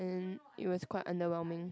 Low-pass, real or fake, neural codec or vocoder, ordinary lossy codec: none; real; none; none